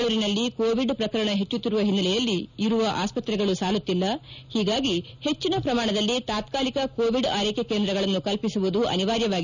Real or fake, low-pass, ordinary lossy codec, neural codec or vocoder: real; 7.2 kHz; none; none